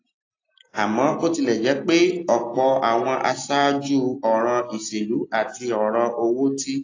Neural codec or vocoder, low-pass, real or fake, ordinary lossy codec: none; 7.2 kHz; real; AAC, 32 kbps